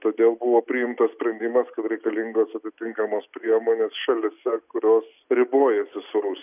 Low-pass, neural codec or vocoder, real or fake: 3.6 kHz; none; real